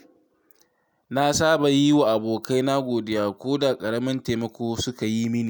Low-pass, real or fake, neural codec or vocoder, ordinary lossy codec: none; real; none; none